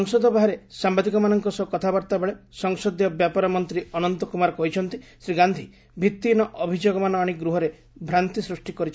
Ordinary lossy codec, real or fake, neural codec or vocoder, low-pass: none; real; none; none